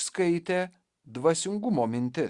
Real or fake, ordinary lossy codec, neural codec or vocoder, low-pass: real; Opus, 64 kbps; none; 10.8 kHz